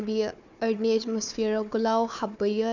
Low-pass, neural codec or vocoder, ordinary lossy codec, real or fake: 7.2 kHz; codec, 16 kHz, 4 kbps, FunCodec, trained on Chinese and English, 50 frames a second; none; fake